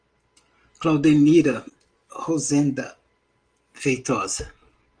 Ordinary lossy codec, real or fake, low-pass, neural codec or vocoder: Opus, 24 kbps; real; 9.9 kHz; none